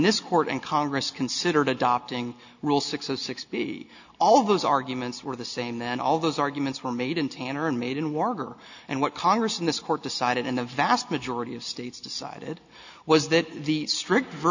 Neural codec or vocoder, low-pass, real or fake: none; 7.2 kHz; real